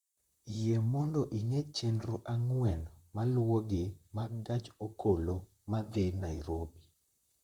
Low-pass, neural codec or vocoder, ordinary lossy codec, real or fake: 19.8 kHz; vocoder, 44.1 kHz, 128 mel bands, Pupu-Vocoder; Opus, 64 kbps; fake